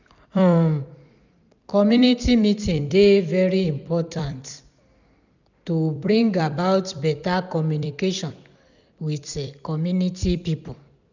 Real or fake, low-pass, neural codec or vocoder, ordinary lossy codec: fake; 7.2 kHz; vocoder, 44.1 kHz, 128 mel bands every 256 samples, BigVGAN v2; none